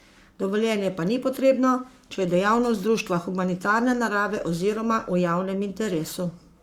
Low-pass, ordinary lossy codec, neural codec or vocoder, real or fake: 19.8 kHz; Opus, 64 kbps; codec, 44.1 kHz, 7.8 kbps, Pupu-Codec; fake